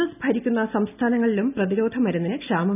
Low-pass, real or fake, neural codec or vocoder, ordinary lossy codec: 3.6 kHz; real; none; none